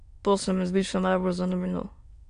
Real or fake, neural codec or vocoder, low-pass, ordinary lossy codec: fake; autoencoder, 22.05 kHz, a latent of 192 numbers a frame, VITS, trained on many speakers; 9.9 kHz; AAC, 64 kbps